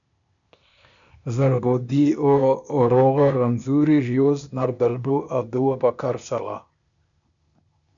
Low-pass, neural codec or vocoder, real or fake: 7.2 kHz; codec, 16 kHz, 0.8 kbps, ZipCodec; fake